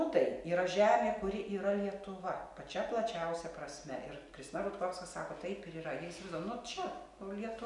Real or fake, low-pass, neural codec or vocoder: real; 10.8 kHz; none